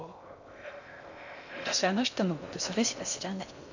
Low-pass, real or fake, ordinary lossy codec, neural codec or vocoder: 7.2 kHz; fake; none; codec, 16 kHz in and 24 kHz out, 0.6 kbps, FocalCodec, streaming, 2048 codes